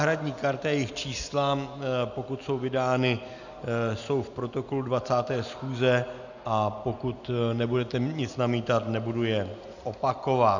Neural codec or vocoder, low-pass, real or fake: none; 7.2 kHz; real